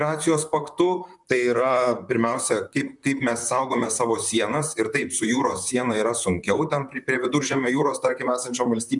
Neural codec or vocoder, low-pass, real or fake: vocoder, 44.1 kHz, 128 mel bands, Pupu-Vocoder; 10.8 kHz; fake